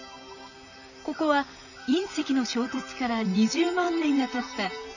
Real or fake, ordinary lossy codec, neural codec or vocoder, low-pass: fake; none; vocoder, 44.1 kHz, 128 mel bands, Pupu-Vocoder; 7.2 kHz